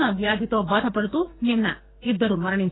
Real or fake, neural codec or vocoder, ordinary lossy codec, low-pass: fake; codec, 44.1 kHz, 2.6 kbps, SNAC; AAC, 16 kbps; 7.2 kHz